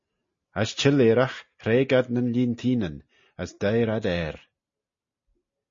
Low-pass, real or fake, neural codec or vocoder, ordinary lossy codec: 7.2 kHz; real; none; MP3, 32 kbps